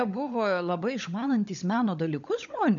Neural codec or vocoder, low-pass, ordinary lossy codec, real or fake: codec, 16 kHz, 16 kbps, FunCodec, trained on LibriTTS, 50 frames a second; 7.2 kHz; MP3, 64 kbps; fake